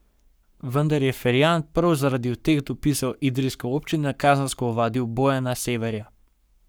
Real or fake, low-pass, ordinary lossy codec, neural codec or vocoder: fake; none; none; codec, 44.1 kHz, 7.8 kbps, Pupu-Codec